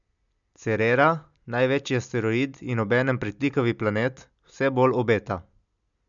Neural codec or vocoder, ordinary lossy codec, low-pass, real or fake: none; none; 7.2 kHz; real